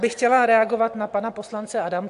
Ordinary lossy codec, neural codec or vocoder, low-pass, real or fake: AAC, 64 kbps; none; 10.8 kHz; real